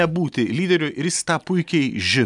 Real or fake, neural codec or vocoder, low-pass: real; none; 10.8 kHz